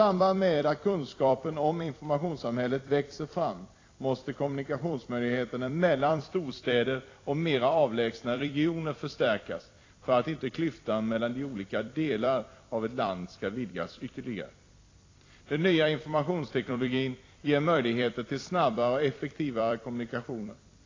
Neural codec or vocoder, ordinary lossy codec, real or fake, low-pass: vocoder, 44.1 kHz, 128 mel bands every 256 samples, BigVGAN v2; AAC, 32 kbps; fake; 7.2 kHz